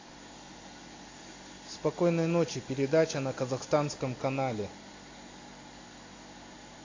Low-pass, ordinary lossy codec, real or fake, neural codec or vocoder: 7.2 kHz; AAC, 32 kbps; real; none